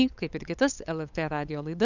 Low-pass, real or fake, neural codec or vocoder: 7.2 kHz; fake; codec, 16 kHz, 8 kbps, FunCodec, trained on LibriTTS, 25 frames a second